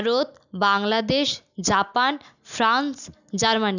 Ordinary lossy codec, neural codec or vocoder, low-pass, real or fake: none; none; 7.2 kHz; real